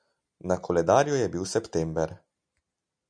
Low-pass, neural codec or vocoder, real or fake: 9.9 kHz; none; real